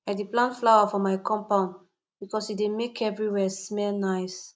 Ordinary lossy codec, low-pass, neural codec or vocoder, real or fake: none; none; none; real